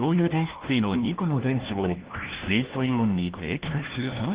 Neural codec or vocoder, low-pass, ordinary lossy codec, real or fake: codec, 16 kHz, 1 kbps, FunCodec, trained on LibriTTS, 50 frames a second; 3.6 kHz; Opus, 16 kbps; fake